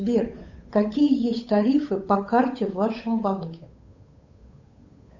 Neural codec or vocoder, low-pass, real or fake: codec, 16 kHz, 8 kbps, FunCodec, trained on Chinese and English, 25 frames a second; 7.2 kHz; fake